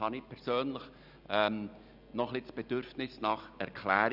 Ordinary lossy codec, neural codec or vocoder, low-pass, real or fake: none; none; 5.4 kHz; real